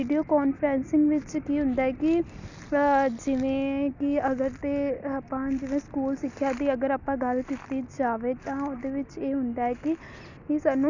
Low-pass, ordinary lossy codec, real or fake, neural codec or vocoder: 7.2 kHz; none; real; none